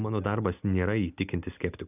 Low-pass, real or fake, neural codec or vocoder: 3.6 kHz; real; none